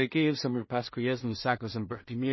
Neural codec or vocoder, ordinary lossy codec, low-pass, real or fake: codec, 16 kHz in and 24 kHz out, 0.4 kbps, LongCat-Audio-Codec, two codebook decoder; MP3, 24 kbps; 7.2 kHz; fake